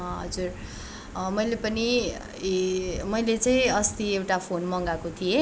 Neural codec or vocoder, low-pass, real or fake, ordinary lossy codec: none; none; real; none